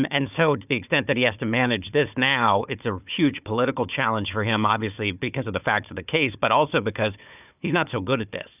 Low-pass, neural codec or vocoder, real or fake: 3.6 kHz; codec, 16 kHz, 4 kbps, FunCodec, trained on Chinese and English, 50 frames a second; fake